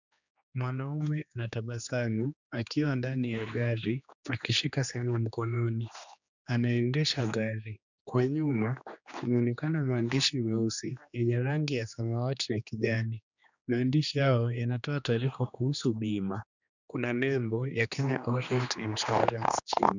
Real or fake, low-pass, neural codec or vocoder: fake; 7.2 kHz; codec, 16 kHz, 2 kbps, X-Codec, HuBERT features, trained on general audio